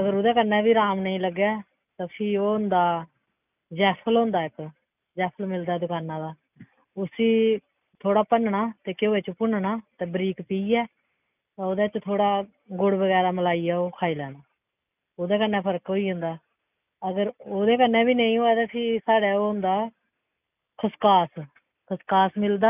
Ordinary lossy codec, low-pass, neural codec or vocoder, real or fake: none; 3.6 kHz; none; real